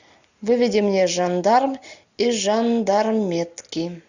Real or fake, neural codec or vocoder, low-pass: real; none; 7.2 kHz